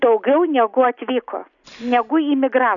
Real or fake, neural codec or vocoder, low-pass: real; none; 7.2 kHz